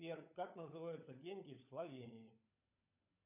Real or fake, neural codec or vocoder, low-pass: fake; codec, 16 kHz, 16 kbps, FunCodec, trained on LibriTTS, 50 frames a second; 3.6 kHz